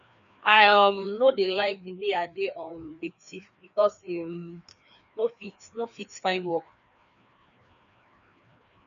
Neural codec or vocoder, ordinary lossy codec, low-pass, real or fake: codec, 16 kHz, 2 kbps, FreqCodec, larger model; none; 7.2 kHz; fake